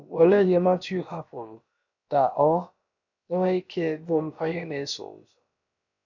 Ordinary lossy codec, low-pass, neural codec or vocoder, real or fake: none; 7.2 kHz; codec, 16 kHz, about 1 kbps, DyCAST, with the encoder's durations; fake